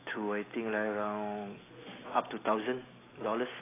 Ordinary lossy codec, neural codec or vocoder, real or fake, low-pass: AAC, 16 kbps; none; real; 3.6 kHz